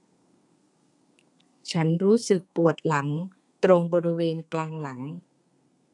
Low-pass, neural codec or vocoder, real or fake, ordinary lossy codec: 10.8 kHz; codec, 32 kHz, 1.9 kbps, SNAC; fake; none